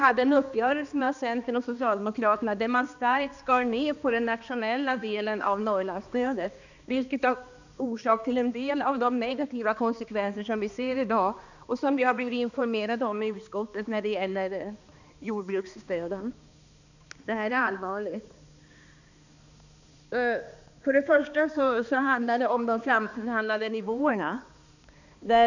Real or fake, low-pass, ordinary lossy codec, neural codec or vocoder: fake; 7.2 kHz; none; codec, 16 kHz, 2 kbps, X-Codec, HuBERT features, trained on balanced general audio